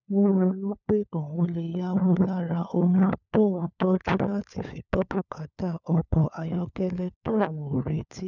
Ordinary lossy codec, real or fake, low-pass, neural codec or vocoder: none; fake; 7.2 kHz; codec, 16 kHz, 4 kbps, FunCodec, trained on LibriTTS, 50 frames a second